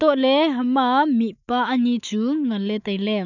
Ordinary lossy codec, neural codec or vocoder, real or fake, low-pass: none; autoencoder, 48 kHz, 128 numbers a frame, DAC-VAE, trained on Japanese speech; fake; 7.2 kHz